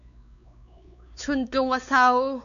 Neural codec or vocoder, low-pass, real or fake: codec, 16 kHz, 4 kbps, X-Codec, WavLM features, trained on Multilingual LibriSpeech; 7.2 kHz; fake